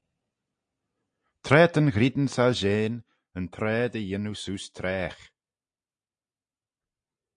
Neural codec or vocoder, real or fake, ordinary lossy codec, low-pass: none; real; AAC, 64 kbps; 9.9 kHz